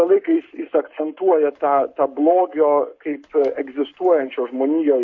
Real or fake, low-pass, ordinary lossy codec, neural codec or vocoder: fake; 7.2 kHz; MP3, 48 kbps; codec, 44.1 kHz, 7.8 kbps, Pupu-Codec